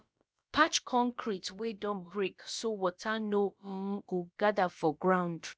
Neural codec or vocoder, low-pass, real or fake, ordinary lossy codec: codec, 16 kHz, about 1 kbps, DyCAST, with the encoder's durations; none; fake; none